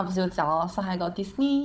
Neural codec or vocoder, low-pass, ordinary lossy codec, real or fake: codec, 16 kHz, 16 kbps, FunCodec, trained on Chinese and English, 50 frames a second; none; none; fake